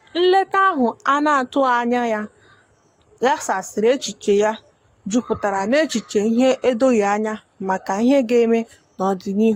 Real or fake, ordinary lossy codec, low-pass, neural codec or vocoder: fake; AAC, 48 kbps; 19.8 kHz; codec, 44.1 kHz, 7.8 kbps, Pupu-Codec